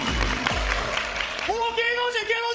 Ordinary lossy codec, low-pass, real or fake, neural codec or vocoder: none; none; fake; codec, 16 kHz, 16 kbps, FreqCodec, larger model